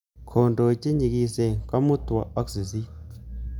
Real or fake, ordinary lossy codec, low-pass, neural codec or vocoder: real; none; 19.8 kHz; none